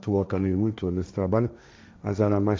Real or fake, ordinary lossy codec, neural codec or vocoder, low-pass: fake; none; codec, 16 kHz, 1.1 kbps, Voila-Tokenizer; none